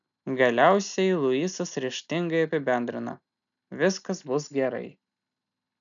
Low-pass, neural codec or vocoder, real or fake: 7.2 kHz; none; real